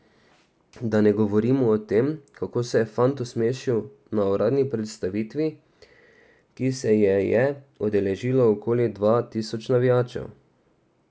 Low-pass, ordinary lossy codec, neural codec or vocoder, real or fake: none; none; none; real